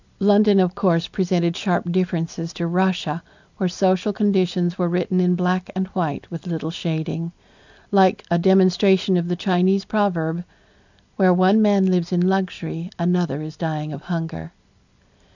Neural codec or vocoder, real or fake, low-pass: none; real; 7.2 kHz